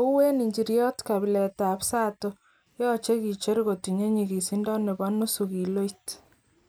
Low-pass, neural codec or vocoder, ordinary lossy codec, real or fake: none; none; none; real